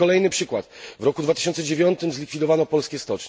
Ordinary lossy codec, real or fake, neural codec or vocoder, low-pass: none; real; none; none